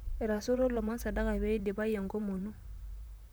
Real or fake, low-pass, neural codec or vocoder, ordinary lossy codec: fake; none; vocoder, 44.1 kHz, 128 mel bands, Pupu-Vocoder; none